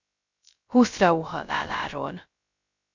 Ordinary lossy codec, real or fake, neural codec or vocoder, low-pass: Opus, 64 kbps; fake; codec, 16 kHz, 0.2 kbps, FocalCodec; 7.2 kHz